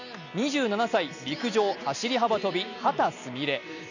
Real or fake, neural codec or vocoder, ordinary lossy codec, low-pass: real; none; none; 7.2 kHz